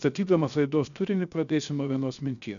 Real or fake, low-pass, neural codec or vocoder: fake; 7.2 kHz; codec, 16 kHz, 0.7 kbps, FocalCodec